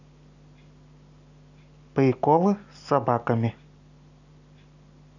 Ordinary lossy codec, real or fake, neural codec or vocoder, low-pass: none; real; none; 7.2 kHz